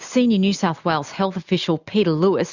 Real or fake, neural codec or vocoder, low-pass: real; none; 7.2 kHz